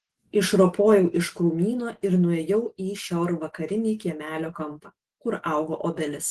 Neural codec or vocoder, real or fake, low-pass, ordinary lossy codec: none; real; 14.4 kHz; Opus, 16 kbps